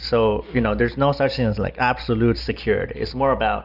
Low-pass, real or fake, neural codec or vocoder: 5.4 kHz; real; none